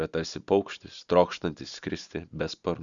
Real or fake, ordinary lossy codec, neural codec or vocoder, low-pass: real; Opus, 64 kbps; none; 7.2 kHz